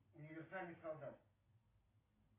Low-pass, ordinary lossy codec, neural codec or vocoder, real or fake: 3.6 kHz; AAC, 16 kbps; none; real